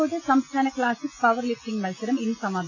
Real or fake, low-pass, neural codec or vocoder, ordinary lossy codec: real; 7.2 kHz; none; AAC, 48 kbps